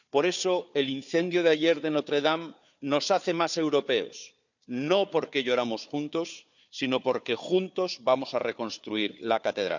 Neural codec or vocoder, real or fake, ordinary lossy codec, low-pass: codec, 16 kHz, 4 kbps, FunCodec, trained on Chinese and English, 50 frames a second; fake; none; 7.2 kHz